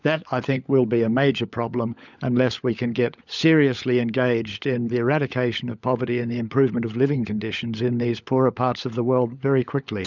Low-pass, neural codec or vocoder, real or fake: 7.2 kHz; codec, 16 kHz, 16 kbps, FunCodec, trained on LibriTTS, 50 frames a second; fake